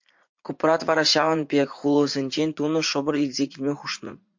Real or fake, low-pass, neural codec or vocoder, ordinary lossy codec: fake; 7.2 kHz; vocoder, 44.1 kHz, 80 mel bands, Vocos; MP3, 48 kbps